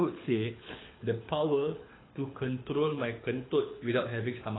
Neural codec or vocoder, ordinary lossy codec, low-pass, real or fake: codec, 24 kHz, 6 kbps, HILCodec; AAC, 16 kbps; 7.2 kHz; fake